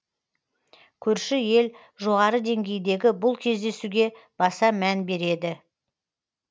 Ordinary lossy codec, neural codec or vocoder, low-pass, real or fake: none; none; none; real